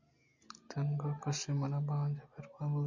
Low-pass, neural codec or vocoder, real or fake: 7.2 kHz; none; real